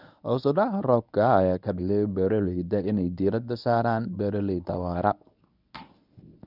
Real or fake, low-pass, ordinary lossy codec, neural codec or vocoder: fake; 5.4 kHz; none; codec, 24 kHz, 0.9 kbps, WavTokenizer, medium speech release version 1